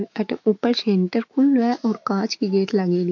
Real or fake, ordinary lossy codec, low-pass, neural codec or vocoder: fake; AAC, 48 kbps; 7.2 kHz; autoencoder, 48 kHz, 128 numbers a frame, DAC-VAE, trained on Japanese speech